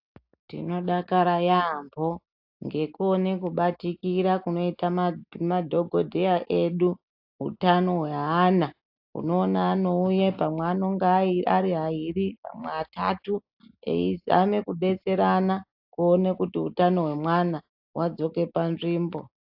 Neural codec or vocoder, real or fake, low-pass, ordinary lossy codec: none; real; 5.4 kHz; AAC, 48 kbps